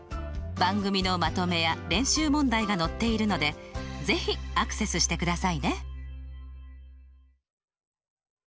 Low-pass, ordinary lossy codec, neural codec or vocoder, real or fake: none; none; none; real